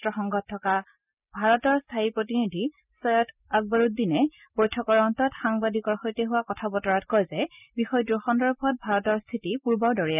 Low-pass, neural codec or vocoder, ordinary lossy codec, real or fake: 3.6 kHz; none; none; real